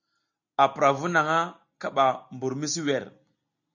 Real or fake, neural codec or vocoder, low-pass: real; none; 7.2 kHz